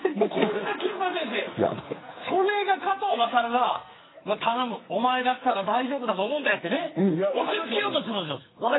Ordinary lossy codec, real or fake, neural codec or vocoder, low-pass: AAC, 16 kbps; fake; codec, 44.1 kHz, 2.6 kbps, SNAC; 7.2 kHz